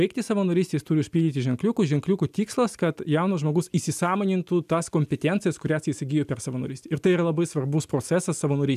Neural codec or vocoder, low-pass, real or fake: none; 14.4 kHz; real